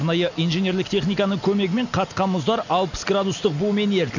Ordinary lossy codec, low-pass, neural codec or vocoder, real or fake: none; 7.2 kHz; none; real